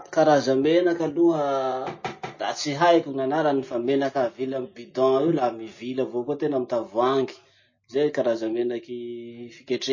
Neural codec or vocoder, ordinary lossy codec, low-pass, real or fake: none; MP3, 32 kbps; 7.2 kHz; real